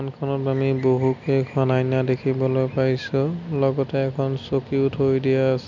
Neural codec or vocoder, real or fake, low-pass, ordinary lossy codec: none; real; 7.2 kHz; none